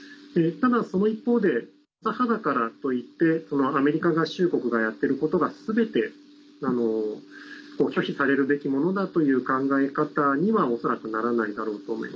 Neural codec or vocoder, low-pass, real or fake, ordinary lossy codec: none; none; real; none